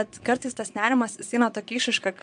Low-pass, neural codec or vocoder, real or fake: 9.9 kHz; vocoder, 22.05 kHz, 80 mel bands, Vocos; fake